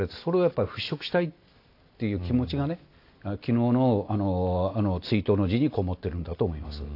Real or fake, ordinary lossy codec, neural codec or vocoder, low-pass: real; AAC, 32 kbps; none; 5.4 kHz